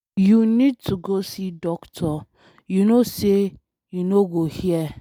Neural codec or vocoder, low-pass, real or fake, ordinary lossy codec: none; none; real; none